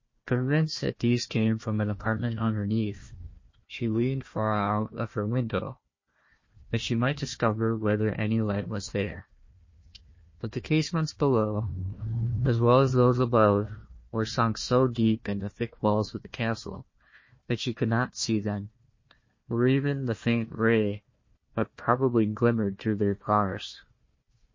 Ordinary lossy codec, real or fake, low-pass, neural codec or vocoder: MP3, 32 kbps; fake; 7.2 kHz; codec, 16 kHz, 1 kbps, FunCodec, trained on Chinese and English, 50 frames a second